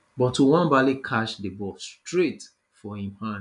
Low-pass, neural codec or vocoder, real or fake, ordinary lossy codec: 10.8 kHz; none; real; none